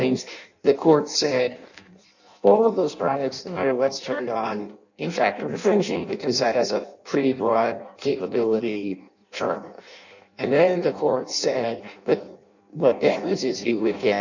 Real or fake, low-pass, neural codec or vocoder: fake; 7.2 kHz; codec, 16 kHz in and 24 kHz out, 0.6 kbps, FireRedTTS-2 codec